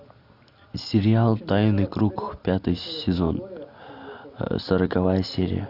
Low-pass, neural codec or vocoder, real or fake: 5.4 kHz; none; real